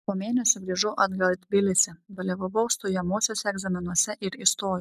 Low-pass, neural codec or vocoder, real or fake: 14.4 kHz; none; real